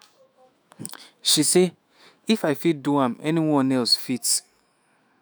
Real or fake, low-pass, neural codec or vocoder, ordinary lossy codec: fake; none; autoencoder, 48 kHz, 128 numbers a frame, DAC-VAE, trained on Japanese speech; none